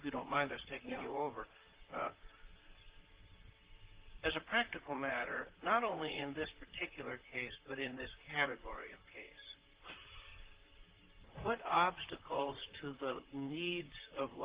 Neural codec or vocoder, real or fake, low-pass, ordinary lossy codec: vocoder, 44.1 kHz, 128 mel bands, Pupu-Vocoder; fake; 3.6 kHz; Opus, 16 kbps